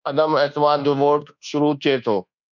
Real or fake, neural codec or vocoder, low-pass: fake; codec, 24 kHz, 1.2 kbps, DualCodec; 7.2 kHz